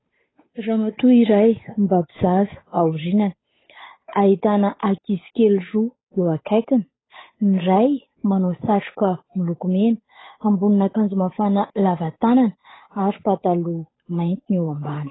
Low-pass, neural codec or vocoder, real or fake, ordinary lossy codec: 7.2 kHz; codec, 16 kHz, 8 kbps, FunCodec, trained on Chinese and English, 25 frames a second; fake; AAC, 16 kbps